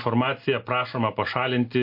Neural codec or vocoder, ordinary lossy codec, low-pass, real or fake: none; MP3, 32 kbps; 5.4 kHz; real